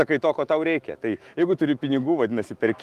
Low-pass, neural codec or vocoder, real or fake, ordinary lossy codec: 14.4 kHz; autoencoder, 48 kHz, 128 numbers a frame, DAC-VAE, trained on Japanese speech; fake; Opus, 24 kbps